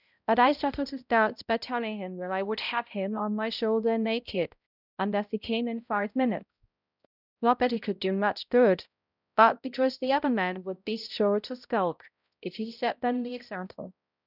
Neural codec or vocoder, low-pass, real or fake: codec, 16 kHz, 0.5 kbps, X-Codec, HuBERT features, trained on balanced general audio; 5.4 kHz; fake